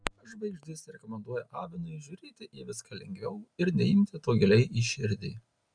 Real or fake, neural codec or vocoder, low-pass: real; none; 9.9 kHz